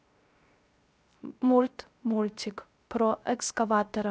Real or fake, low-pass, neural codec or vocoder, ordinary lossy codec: fake; none; codec, 16 kHz, 0.3 kbps, FocalCodec; none